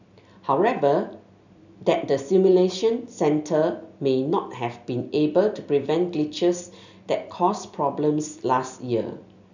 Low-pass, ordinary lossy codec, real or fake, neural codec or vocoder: 7.2 kHz; none; real; none